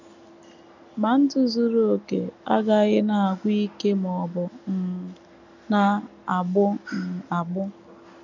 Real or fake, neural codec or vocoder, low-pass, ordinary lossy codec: real; none; 7.2 kHz; none